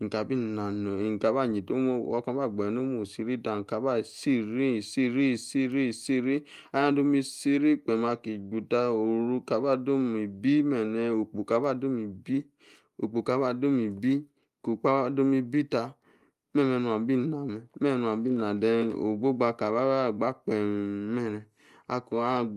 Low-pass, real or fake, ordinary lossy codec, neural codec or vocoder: 14.4 kHz; real; Opus, 32 kbps; none